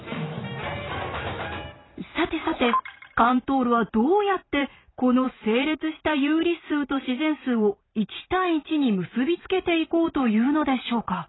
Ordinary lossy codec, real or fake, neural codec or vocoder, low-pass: AAC, 16 kbps; fake; vocoder, 44.1 kHz, 128 mel bands every 512 samples, BigVGAN v2; 7.2 kHz